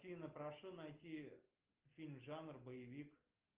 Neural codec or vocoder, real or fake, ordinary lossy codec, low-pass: none; real; Opus, 32 kbps; 3.6 kHz